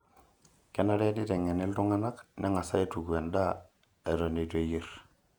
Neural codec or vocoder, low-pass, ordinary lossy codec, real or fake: vocoder, 48 kHz, 128 mel bands, Vocos; 19.8 kHz; none; fake